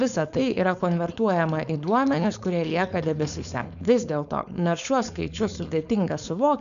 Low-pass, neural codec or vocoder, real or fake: 7.2 kHz; codec, 16 kHz, 4.8 kbps, FACodec; fake